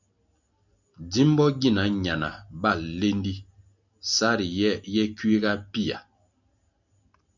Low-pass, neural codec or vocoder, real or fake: 7.2 kHz; none; real